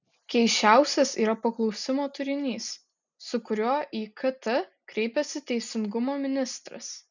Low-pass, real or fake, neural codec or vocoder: 7.2 kHz; real; none